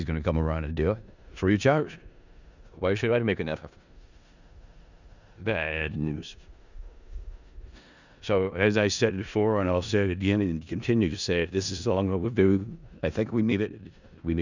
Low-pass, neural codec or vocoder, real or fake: 7.2 kHz; codec, 16 kHz in and 24 kHz out, 0.4 kbps, LongCat-Audio-Codec, four codebook decoder; fake